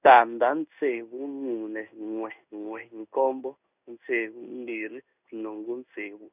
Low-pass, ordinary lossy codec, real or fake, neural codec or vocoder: 3.6 kHz; none; fake; codec, 16 kHz in and 24 kHz out, 1 kbps, XY-Tokenizer